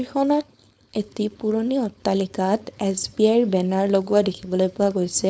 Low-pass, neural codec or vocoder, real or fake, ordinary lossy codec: none; codec, 16 kHz, 4.8 kbps, FACodec; fake; none